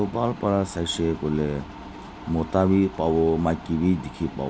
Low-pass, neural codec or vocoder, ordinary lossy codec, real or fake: none; none; none; real